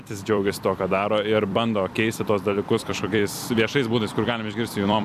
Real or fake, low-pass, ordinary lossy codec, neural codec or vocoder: fake; 14.4 kHz; AAC, 96 kbps; vocoder, 44.1 kHz, 128 mel bands every 256 samples, BigVGAN v2